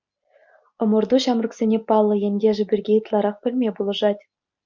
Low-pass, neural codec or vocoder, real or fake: 7.2 kHz; none; real